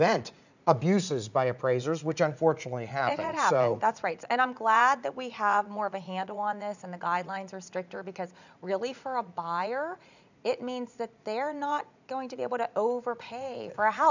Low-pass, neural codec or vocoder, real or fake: 7.2 kHz; vocoder, 44.1 kHz, 80 mel bands, Vocos; fake